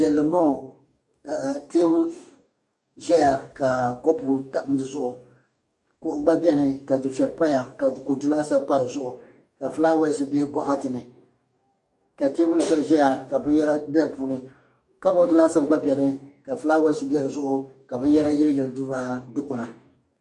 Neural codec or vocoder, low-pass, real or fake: codec, 44.1 kHz, 2.6 kbps, DAC; 10.8 kHz; fake